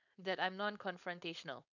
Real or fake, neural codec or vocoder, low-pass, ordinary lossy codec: fake; codec, 16 kHz, 4.8 kbps, FACodec; 7.2 kHz; none